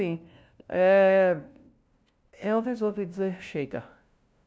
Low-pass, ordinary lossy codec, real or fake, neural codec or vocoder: none; none; fake; codec, 16 kHz, 0.5 kbps, FunCodec, trained on LibriTTS, 25 frames a second